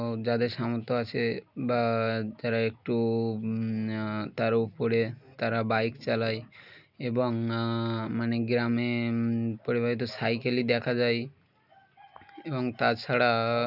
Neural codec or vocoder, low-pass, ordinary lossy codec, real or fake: none; 5.4 kHz; none; real